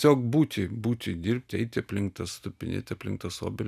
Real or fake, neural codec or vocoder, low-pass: real; none; 14.4 kHz